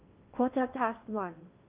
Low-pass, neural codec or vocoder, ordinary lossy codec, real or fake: 3.6 kHz; codec, 16 kHz in and 24 kHz out, 0.8 kbps, FocalCodec, streaming, 65536 codes; Opus, 24 kbps; fake